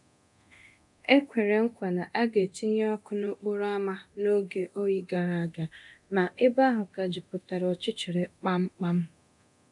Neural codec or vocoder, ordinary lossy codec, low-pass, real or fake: codec, 24 kHz, 0.9 kbps, DualCodec; none; 10.8 kHz; fake